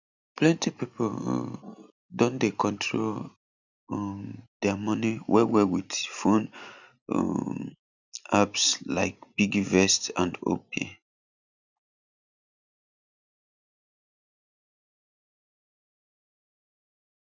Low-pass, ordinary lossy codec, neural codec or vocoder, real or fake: 7.2 kHz; none; none; real